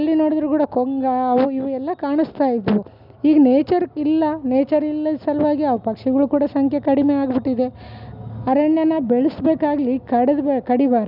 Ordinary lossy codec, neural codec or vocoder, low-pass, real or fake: none; none; 5.4 kHz; real